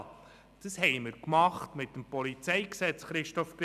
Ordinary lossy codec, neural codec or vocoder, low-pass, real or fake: none; none; 14.4 kHz; real